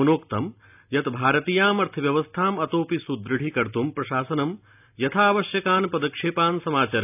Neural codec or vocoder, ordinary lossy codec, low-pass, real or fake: none; none; 3.6 kHz; real